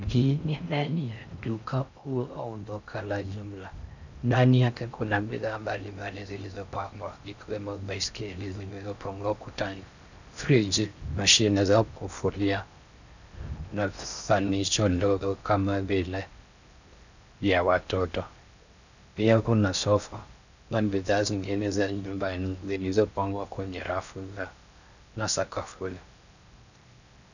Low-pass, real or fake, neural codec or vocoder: 7.2 kHz; fake; codec, 16 kHz in and 24 kHz out, 0.6 kbps, FocalCodec, streaming, 4096 codes